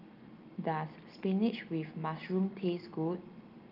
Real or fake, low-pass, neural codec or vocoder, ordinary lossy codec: real; 5.4 kHz; none; Opus, 32 kbps